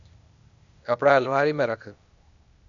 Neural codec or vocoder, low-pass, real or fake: codec, 16 kHz, 0.8 kbps, ZipCodec; 7.2 kHz; fake